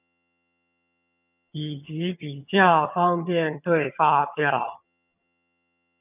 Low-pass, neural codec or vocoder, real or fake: 3.6 kHz; vocoder, 22.05 kHz, 80 mel bands, HiFi-GAN; fake